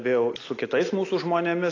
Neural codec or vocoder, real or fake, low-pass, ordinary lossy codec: none; real; 7.2 kHz; AAC, 32 kbps